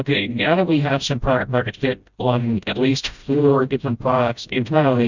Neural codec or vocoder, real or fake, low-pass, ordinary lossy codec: codec, 16 kHz, 0.5 kbps, FreqCodec, smaller model; fake; 7.2 kHz; Opus, 64 kbps